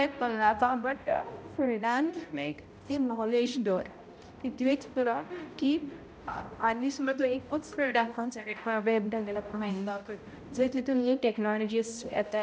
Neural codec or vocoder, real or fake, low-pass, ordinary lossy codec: codec, 16 kHz, 0.5 kbps, X-Codec, HuBERT features, trained on balanced general audio; fake; none; none